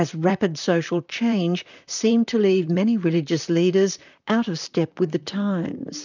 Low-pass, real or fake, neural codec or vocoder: 7.2 kHz; fake; vocoder, 44.1 kHz, 128 mel bands, Pupu-Vocoder